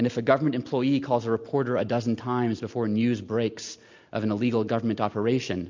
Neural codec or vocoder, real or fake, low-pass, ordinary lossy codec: none; real; 7.2 kHz; MP3, 64 kbps